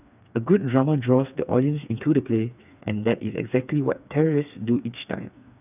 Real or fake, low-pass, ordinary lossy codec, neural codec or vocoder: fake; 3.6 kHz; none; codec, 16 kHz, 4 kbps, FreqCodec, smaller model